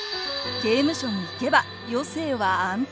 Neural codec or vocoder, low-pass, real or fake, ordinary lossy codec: none; none; real; none